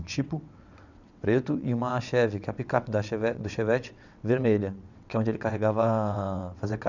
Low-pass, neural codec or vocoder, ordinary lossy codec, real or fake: 7.2 kHz; vocoder, 22.05 kHz, 80 mel bands, WaveNeXt; none; fake